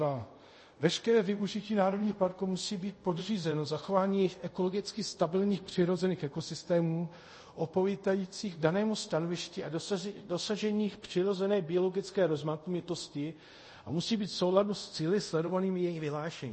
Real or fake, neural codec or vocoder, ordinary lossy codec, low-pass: fake; codec, 24 kHz, 0.5 kbps, DualCodec; MP3, 32 kbps; 10.8 kHz